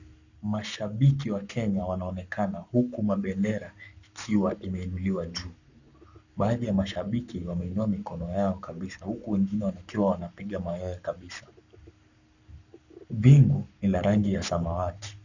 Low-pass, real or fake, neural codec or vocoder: 7.2 kHz; fake; codec, 44.1 kHz, 7.8 kbps, Pupu-Codec